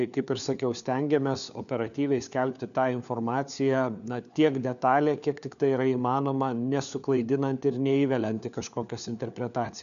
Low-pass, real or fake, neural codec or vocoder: 7.2 kHz; fake; codec, 16 kHz, 4 kbps, FunCodec, trained on LibriTTS, 50 frames a second